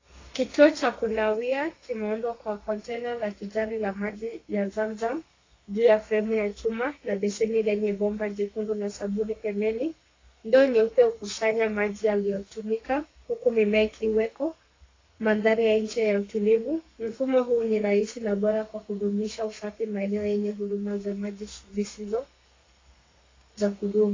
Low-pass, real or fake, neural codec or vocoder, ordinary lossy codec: 7.2 kHz; fake; codec, 32 kHz, 1.9 kbps, SNAC; AAC, 32 kbps